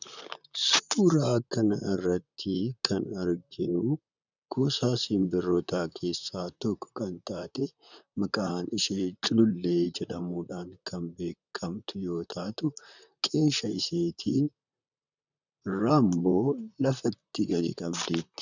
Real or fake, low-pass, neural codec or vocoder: fake; 7.2 kHz; vocoder, 44.1 kHz, 128 mel bands, Pupu-Vocoder